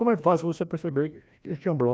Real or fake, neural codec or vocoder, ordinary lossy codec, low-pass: fake; codec, 16 kHz, 1 kbps, FreqCodec, larger model; none; none